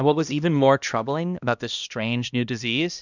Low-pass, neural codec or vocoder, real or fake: 7.2 kHz; codec, 16 kHz, 1 kbps, X-Codec, HuBERT features, trained on balanced general audio; fake